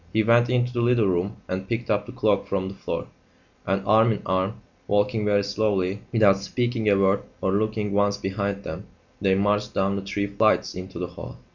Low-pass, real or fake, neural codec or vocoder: 7.2 kHz; real; none